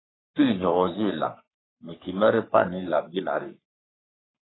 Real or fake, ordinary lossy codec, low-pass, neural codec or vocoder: fake; AAC, 16 kbps; 7.2 kHz; codec, 44.1 kHz, 3.4 kbps, Pupu-Codec